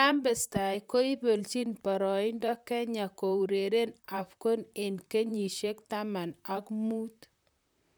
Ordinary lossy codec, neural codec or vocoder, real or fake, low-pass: none; vocoder, 44.1 kHz, 128 mel bands, Pupu-Vocoder; fake; none